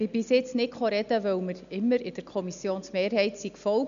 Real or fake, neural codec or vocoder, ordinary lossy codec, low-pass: real; none; MP3, 96 kbps; 7.2 kHz